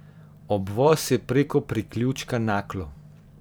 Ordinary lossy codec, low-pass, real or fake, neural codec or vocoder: none; none; fake; vocoder, 44.1 kHz, 128 mel bands every 512 samples, BigVGAN v2